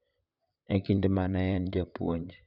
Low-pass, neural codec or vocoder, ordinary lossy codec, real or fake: 5.4 kHz; vocoder, 44.1 kHz, 128 mel bands, Pupu-Vocoder; none; fake